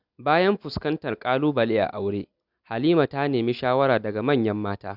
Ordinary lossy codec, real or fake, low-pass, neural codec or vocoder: none; real; 5.4 kHz; none